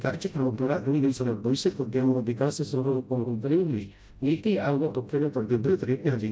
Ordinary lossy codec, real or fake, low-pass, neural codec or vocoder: none; fake; none; codec, 16 kHz, 0.5 kbps, FreqCodec, smaller model